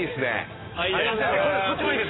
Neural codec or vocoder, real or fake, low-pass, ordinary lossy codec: none; real; 7.2 kHz; AAC, 16 kbps